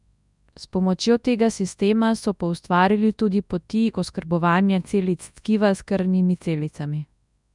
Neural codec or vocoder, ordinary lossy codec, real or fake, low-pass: codec, 24 kHz, 0.9 kbps, WavTokenizer, large speech release; none; fake; 10.8 kHz